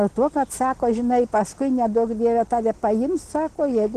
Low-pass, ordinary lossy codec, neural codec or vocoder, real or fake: 14.4 kHz; Opus, 16 kbps; none; real